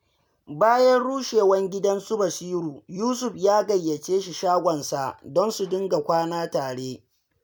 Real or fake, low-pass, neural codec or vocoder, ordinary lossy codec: real; none; none; none